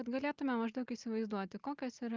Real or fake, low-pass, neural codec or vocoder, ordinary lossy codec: real; 7.2 kHz; none; Opus, 32 kbps